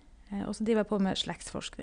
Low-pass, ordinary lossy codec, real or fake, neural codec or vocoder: 9.9 kHz; none; real; none